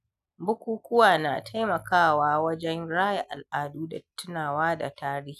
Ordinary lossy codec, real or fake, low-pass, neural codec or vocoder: none; real; 14.4 kHz; none